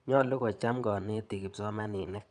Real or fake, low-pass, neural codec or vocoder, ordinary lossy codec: fake; 9.9 kHz; vocoder, 22.05 kHz, 80 mel bands, Vocos; none